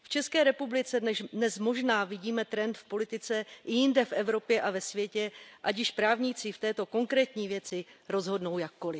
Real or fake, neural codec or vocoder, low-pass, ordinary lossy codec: real; none; none; none